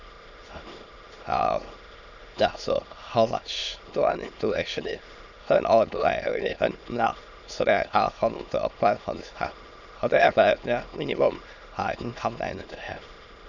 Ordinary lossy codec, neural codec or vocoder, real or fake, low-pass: none; autoencoder, 22.05 kHz, a latent of 192 numbers a frame, VITS, trained on many speakers; fake; 7.2 kHz